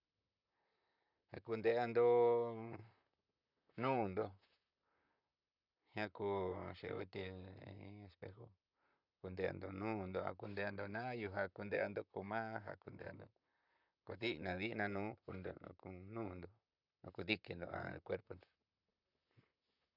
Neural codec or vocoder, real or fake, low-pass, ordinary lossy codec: vocoder, 44.1 kHz, 128 mel bands, Pupu-Vocoder; fake; 5.4 kHz; none